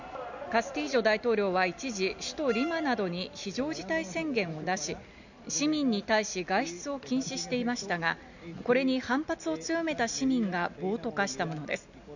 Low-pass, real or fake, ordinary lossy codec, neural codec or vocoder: 7.2 kHz; real; none; none